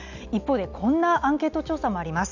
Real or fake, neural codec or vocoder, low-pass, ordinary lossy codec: real; none; 7.2 kHz; none